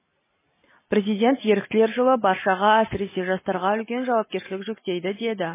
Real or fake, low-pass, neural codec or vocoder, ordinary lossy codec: real; 3.6 kHz; none; MP3, 16 kbps